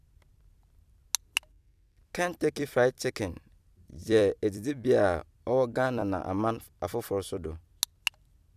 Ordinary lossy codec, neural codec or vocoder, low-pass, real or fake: none; vocoder, 44.1 kHz, 128 mel bands every 256 samples, BigVGAN v2; 14.4 kHz; fake